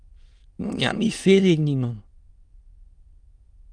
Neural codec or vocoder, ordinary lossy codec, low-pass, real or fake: autoencoder, 22.05 kHz, a latent of 192 numbers a frame, VITS, trained on many speakers; Opus, 32 kbps; 9.9 kHz; fake